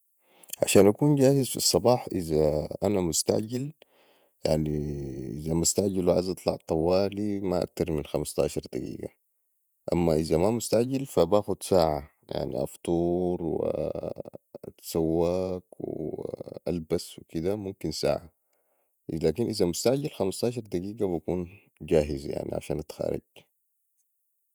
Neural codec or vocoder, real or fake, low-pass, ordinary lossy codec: vocoder, 48 kHz, 128 mel bands, Vocos; fake; none; none